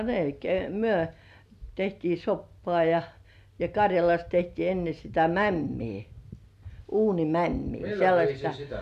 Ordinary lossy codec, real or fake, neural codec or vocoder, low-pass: none; real; none; 14.4 kHz